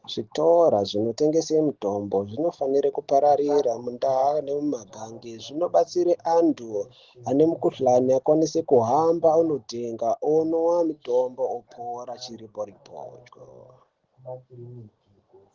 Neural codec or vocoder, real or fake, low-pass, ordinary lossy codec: none; real; 7.2 kHz; Opus, 16 kbps